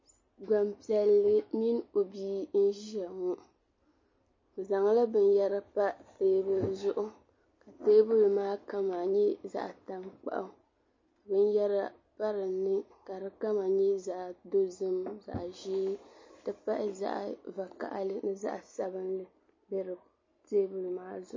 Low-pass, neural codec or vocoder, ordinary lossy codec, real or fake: 7.2 kHz; none; MP3, 32 kbps; real